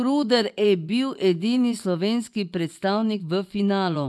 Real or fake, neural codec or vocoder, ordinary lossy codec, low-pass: fake; vocoder, 24 kHz, 100 mel bands, Vocos; none; none